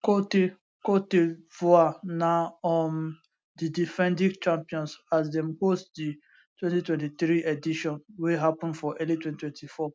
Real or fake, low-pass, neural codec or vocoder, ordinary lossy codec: real; none; none; none